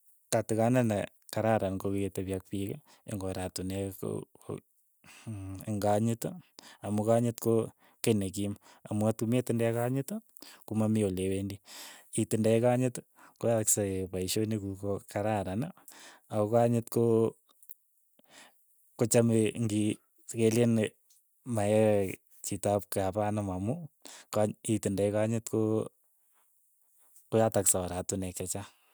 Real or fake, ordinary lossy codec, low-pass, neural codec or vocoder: real; none; none; none